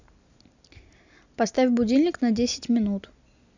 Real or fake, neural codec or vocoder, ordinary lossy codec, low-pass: real; none; none; 7.2 kHz